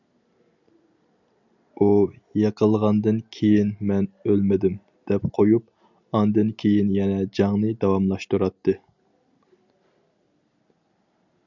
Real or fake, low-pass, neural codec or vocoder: real; 7.2 kHz; none